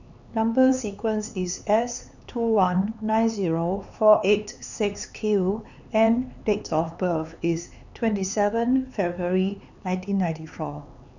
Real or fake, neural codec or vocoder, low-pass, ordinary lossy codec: fake; codec, 16 kHz, 4 kbps, X-Codec, HuBERT features, trained on LibriSpeech; 7.2 kHz; none